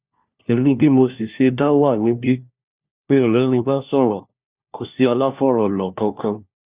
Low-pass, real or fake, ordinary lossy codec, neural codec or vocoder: 3.6 kHz; fake; Opus, 64 kbps; codec, 16 kHz, 1 kbps, FunCodec, trained on LibriTTS, 50 frames a second